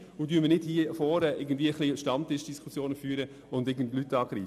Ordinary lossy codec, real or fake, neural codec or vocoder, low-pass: none; real; none; 14.4 kHz